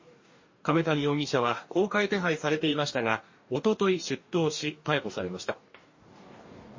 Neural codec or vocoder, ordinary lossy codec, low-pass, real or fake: codec, 44.1 kHz, 2.6 kbps, DAC; MP3, 32 kbps; 7.2 kHz; fake